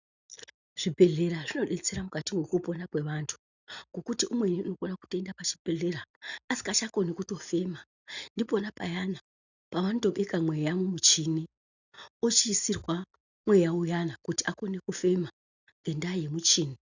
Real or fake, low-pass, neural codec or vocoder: real; 7.2 kHz; none